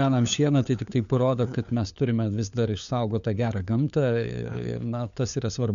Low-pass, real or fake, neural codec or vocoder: 7.2 kHz; fake; codec, 16 kHz, 4 kbps, FunCodec, trained on LibriTTS, 50 frames a second